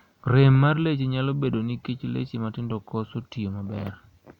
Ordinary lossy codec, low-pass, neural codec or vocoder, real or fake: none; 19.8 kHz; none; real